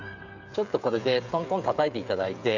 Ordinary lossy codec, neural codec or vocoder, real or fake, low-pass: none; codec, 16 kHz, 16 kbps, FreqCodec, smaller model; fake; 7.2 kHz